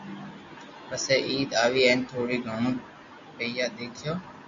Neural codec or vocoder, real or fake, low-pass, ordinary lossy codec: none; real; 7.2 kHz; MP3, 64 kbps